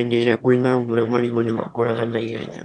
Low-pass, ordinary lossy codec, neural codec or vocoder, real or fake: 9.9 kHz; none; autoencoder, 22.05 kHz, a latent of 192 numbers a frame, VITS, trained on one speaker; fake